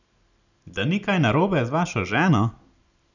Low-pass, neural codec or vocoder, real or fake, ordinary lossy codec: 7.2 kHz; none; real; none